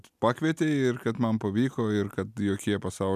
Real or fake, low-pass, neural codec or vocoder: real; 14.4 kHz; none